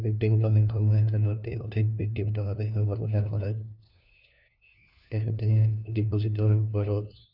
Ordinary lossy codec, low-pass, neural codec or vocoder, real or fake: none; 5.4 kHz; codec, 16 kHz, 1 kbps, FunCodec, trained on LibriTTS, 50 frames a second; fake